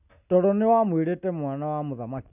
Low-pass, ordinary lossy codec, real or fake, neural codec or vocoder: 3.6 kHz; none; real; none